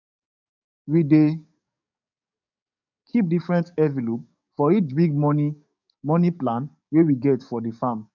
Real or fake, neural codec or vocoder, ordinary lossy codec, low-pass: fake; codec, 44.1 kHz, 7.8 kbps, DAC; none; 7.2 kHz